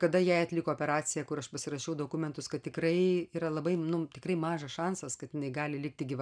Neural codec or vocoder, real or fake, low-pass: none; real; 9.9 kHz